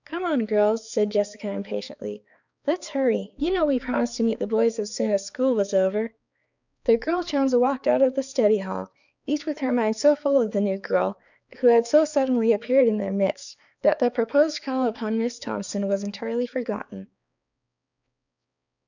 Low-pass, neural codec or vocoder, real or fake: 7.2 kHz; codec, 16 kHz, 4 kbps, X-Codec, HuBERT features, trained on balanced general audio; fake